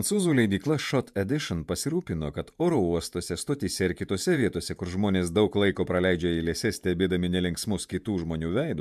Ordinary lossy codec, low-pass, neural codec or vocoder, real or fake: MP3, 96 kbps; 14.4 kHz; vocoder, 48 kHz, 128 mel bands, Vocos; fake